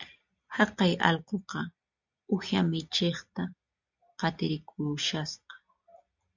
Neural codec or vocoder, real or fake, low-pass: none; real; 7.2 kHz